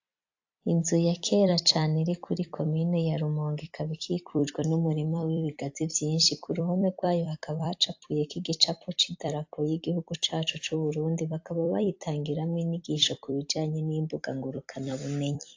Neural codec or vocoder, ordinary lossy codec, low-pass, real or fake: vocoder, 44.1 kHz, 128 mel bands every 256 samples, BigVGAN v2; AAC, 48 kbps; 7.2 kHz; fake